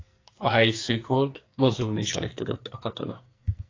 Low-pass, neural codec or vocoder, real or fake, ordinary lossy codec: 7.2 kHz; codec, 44.1 kHz, 2.6 kbps, SNAC; fake; AAC, 32 kbps